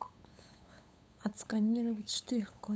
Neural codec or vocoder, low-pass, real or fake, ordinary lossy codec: codec, 16 kHz, 8 kbps, FunCodec, trained on LibriTTS, 25 frames a second; none; fake; none